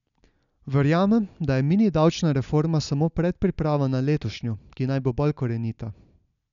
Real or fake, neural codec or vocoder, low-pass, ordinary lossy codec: real; none; 7.2 kHz; none